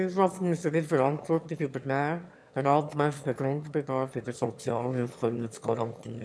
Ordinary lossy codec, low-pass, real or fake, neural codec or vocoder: none; none; fake; autoencoder, 22.05 kHz, a latent of 192 numbers a frame, VITS, trained on one speaker